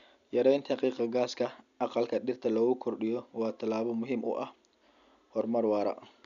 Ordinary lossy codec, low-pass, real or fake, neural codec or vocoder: none; 7.2 kHz; real; none